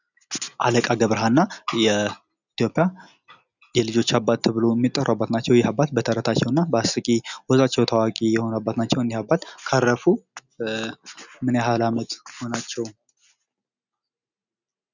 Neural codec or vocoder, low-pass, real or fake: none; 7.2 kHz; real